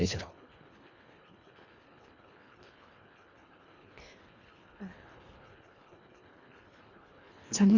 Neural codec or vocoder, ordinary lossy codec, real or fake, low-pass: codec, 24 kHz, 1.5 kbps, HILCodec; Opus, 64 kbps; fake; 7.2 kHz